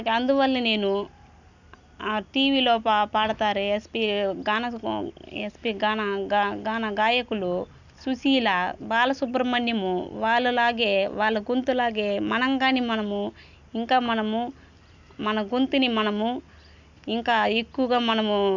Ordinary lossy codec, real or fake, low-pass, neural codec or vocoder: none; real; 7.2 kHz; none